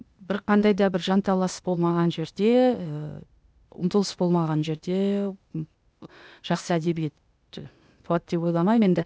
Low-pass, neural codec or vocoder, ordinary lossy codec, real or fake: none; codec, 16 kHz, 0.8 kbps, ZipCodec; none; fake